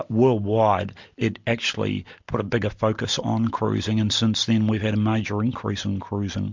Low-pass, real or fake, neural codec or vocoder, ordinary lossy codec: 7.2 kHz; real; none; MP3, 64 kbps